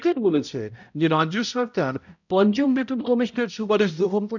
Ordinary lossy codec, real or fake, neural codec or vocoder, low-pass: none; fake; codec, 16 kHz, 0.5 kbps, X-Codec, HuBERT features, trained on balanced general audio; 7.2 kHz